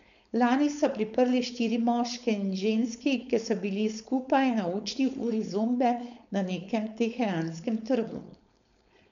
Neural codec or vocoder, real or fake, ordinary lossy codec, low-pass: codec, 16 kHz, 4.8 kbps, FACodec; fake; none; 7.2 kHz